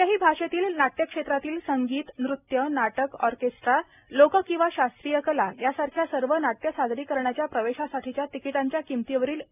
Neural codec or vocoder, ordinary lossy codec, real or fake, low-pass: none; none; real; 3.6 kHz